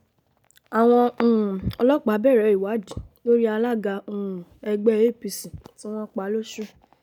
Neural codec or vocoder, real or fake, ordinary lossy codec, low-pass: none; real; none; 19.8 kHz